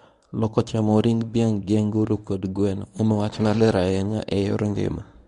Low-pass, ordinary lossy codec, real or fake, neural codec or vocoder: 10.8 kHz; none; fake; codec, 24 kHz, 0.9 kbps, WavTokenizer, medium speech release version 1